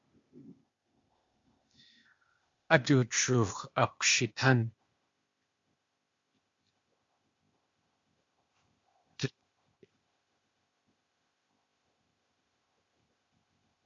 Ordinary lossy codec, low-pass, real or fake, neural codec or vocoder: MP3, 48 kbps; 7.2 kHz; fake; codec, 16 kHz, 0.8 kbps, ZipCodec